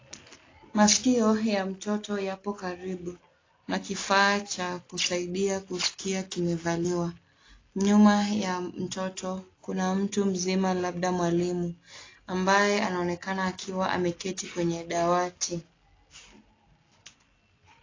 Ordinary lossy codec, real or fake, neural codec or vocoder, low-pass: AAC, 32 kbps; real; none; 7.2 kHz